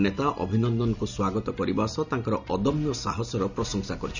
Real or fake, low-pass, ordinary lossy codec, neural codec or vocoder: real; 7.2 kHz; none; none